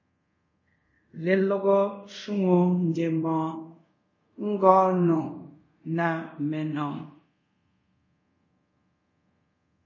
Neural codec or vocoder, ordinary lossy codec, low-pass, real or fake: codec, 24 kHz, 0.5 kbps, DualCodec; MP3, 32 kbps; 7.2 kHz; fake